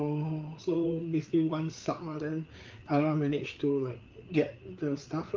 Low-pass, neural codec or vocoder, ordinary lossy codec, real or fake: 7.2 kHz; codec, 16 kHz, 4 kbps, FreqCodec, larger model; Opus, 24 kbps; fake